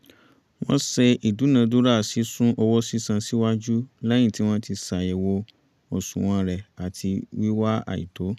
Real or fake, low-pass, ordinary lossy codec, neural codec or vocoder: real; 14.4 kHz; none; none